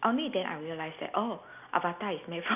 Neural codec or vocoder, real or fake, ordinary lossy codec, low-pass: none; real; none; 3.6 kHz